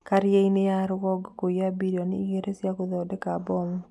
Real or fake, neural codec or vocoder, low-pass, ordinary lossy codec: real; none; none; none